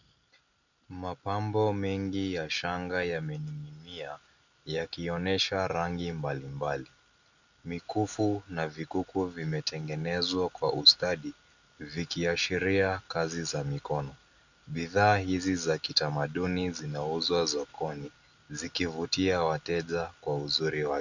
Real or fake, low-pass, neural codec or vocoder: real; 7.2 kHz; none